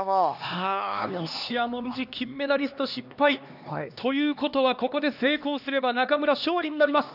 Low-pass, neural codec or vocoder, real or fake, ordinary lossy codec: 5.4 kHz; codec, 16 kHz, 2 kbps, X-Codec, HuBERT features, trained on LibriSpeech; fake; none